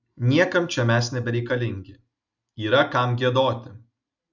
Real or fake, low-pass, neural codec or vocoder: real; 7.2 kHz; none